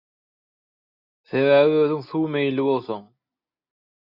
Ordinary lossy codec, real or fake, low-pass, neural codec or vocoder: AAC, 48 kbps; real; 5.4 kHz; none